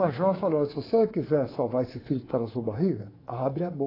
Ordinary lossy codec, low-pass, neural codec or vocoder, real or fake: AAC, 24 kbps; 5.4 kHz; codec, 24 kHz, 3.1 kbps, DualCodec; fake